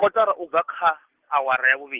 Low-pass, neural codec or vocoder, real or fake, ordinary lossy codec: 3.6 kHz; none; real; Opus, 16 kbps